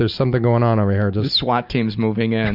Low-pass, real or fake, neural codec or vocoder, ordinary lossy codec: 5.4 kHz; real; none; Opus, 64 kbps